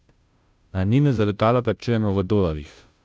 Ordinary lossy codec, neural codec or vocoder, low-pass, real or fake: none; codec, 16 kHz, 0.5 kbps, FunCodec, trained on Chinese and English, 25 frames a second; none; fake